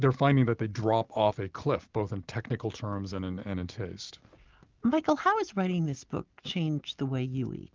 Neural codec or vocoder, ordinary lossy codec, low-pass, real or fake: none; Opus, 32 kbps; 7.2 kHz; real